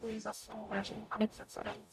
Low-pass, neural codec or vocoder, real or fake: 14.4 kHz; codec, 44.1 kHz, 0.9 kbps, DAC; fake